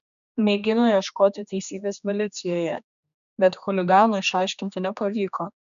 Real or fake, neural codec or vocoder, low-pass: fake; codec, 16 kHz, 2 kbps, X-Codec, HuBERT features, trained on general audio; 7.2 kHz